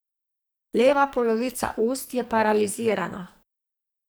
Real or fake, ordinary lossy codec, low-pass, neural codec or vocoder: fake; none; none; codec, 44.1 kHz, 2.6 kbps, SNAC